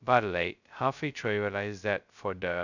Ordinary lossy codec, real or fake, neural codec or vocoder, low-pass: none; fake; codec, 16 kHz, 0.2 kbps, FocalCodec; 7.2 kHz